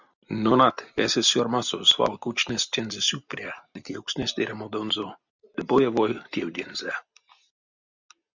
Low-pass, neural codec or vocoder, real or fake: 7.2 kHz; none; real